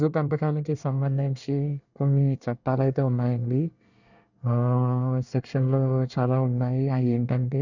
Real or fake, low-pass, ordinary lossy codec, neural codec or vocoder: fake; 7.2 kHz; none; codec, 24 kHz, 1 kbps, SNAC